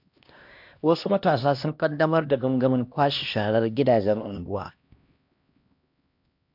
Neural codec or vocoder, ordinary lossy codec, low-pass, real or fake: codec, 16 kHz, 1 kbps, X-Codec, HuBERT features, trained on LibriSpeech; MP3, 48 kbps; 5.4 kHz; fake